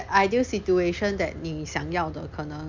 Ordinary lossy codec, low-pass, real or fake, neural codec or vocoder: MP3, 64 kbps; 7.2 kHz; real; none